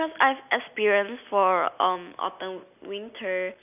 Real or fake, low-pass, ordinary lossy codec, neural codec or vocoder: real; 3.6 kHz; none; none